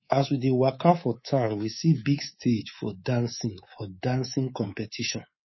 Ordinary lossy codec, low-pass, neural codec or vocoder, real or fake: MP3, 24 kbps; 7.2 kHz; codec, 24 kHz, 3.1 kbps, DualCodec; fake